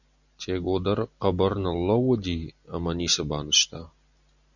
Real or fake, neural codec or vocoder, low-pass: real; none; 7.2 kHz